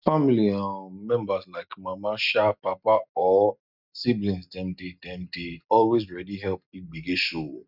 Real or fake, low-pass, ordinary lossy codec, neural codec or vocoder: real; 5.4 kHz; none; none